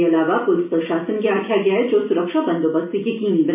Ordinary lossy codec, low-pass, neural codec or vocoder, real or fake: MP3, 32 kbps; 3.6 kHz; none; real